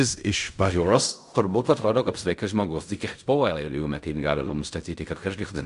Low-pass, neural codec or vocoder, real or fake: 10.8 kHz; codec, 16 kHz in and 24 kHz out, 0.4 kbps, LongCat-Audio-Codec, fine tuned four codebook decoder; fake